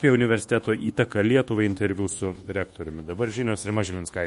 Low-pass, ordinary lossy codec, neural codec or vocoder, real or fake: 10.8 kHz; MP3, 48 kbps; codec, 24 kHz, 1.2 kbps, DualCodec; fake